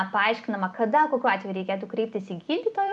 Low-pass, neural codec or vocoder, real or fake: 10.8 kHz; vocoder, 44.1 kHz, 128 mel bands every 256 samples, BigVGAN v2; fake